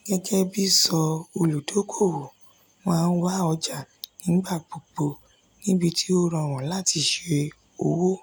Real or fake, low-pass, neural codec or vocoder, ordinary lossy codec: real; none; none; none